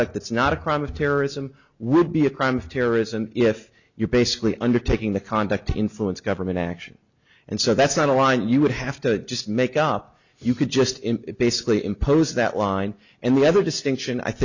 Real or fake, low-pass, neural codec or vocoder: real; 7.2 kHz; none